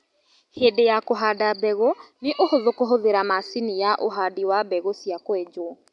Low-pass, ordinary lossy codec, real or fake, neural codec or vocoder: 10.8 kHz; none; real; none